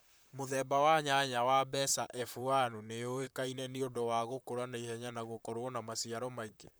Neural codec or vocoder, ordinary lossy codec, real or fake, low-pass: vocoder, 44.1 kHz, 128 mel bands, Pupu-Vocoder; none; fake; none